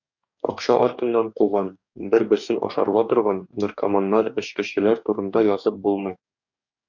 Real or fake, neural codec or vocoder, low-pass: fake; codec, 44.1 kHz, 2.6 kbps, DAC; 7.2 kHz